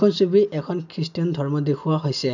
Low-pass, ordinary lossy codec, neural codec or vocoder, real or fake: 7.2 kHz; none; none; real